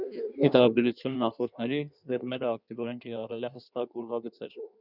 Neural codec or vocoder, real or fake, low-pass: codec, 16 kHz in and 24 kHz out, 1.1 kbps, FireRedTTS-2 codec; fake; 5.4 kHz